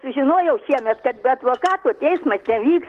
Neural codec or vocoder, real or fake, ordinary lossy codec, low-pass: none; real; Opus, 16 kbps; 14.4 kHz